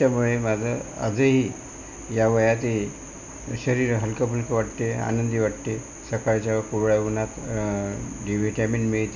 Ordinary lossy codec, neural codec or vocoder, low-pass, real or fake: none; none; 7.2 kHz; real